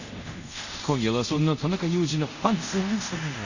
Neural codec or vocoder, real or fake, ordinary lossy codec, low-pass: codec, 24 kHz, 0.5 kbps, DualCodec; fake; none; 7.2 kHz